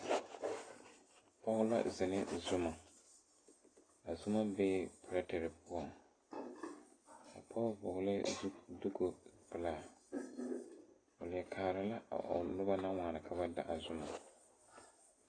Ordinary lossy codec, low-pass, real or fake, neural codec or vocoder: AAC, 32 kbps; 9.9 kHz; real; none